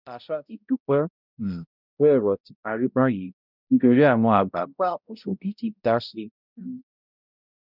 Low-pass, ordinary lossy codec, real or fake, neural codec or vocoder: 5.4 kHz; none; fake; codec, 16 kHz, 0.5 kbps, X-Codec, HuBERT features, trained on balanced general audio